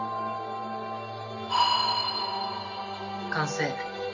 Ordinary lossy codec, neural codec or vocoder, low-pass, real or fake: none; none; 7.2 kHz; real